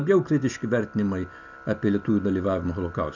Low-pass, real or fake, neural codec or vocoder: 7.2 kHz; real; none